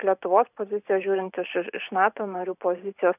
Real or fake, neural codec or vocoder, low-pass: fake; vocoder, 44.1 kHz, 128 mel bands every 512 samples, BigVGAN v2; 3.6 kHz